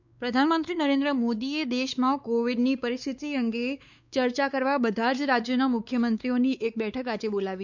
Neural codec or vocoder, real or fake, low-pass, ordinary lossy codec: codec, 16 kHz, 4 kbps, X-Codec, WavLM features, trained on Multilingual LibriSpeech; fake; 7.2 kHz; none